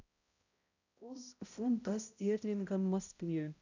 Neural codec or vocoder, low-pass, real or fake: codec, 16 kHz, 0.5 kbps, X-Codec, HuBERT features, trained on balanced general audio; 7.2 kHz; fake